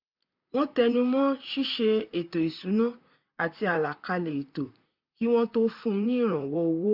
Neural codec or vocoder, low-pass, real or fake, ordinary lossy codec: vocoder, 44.1 kHz, 128 mel bands, Pupu-Vocoder; 5.4 kHz; fake; none